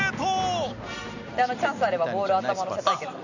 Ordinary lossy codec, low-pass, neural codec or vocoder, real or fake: none; 7.2 kHz; none; real